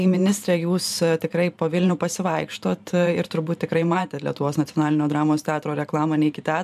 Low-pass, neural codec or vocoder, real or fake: 14.4 kHz; vocoder, 44.1 kHz, 128 mel bands every 512 samples, BigVGAN v2; fake